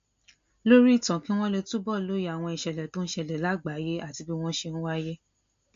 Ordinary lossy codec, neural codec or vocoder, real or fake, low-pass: MP3, 48 kbps; none; real; 7.2 kHz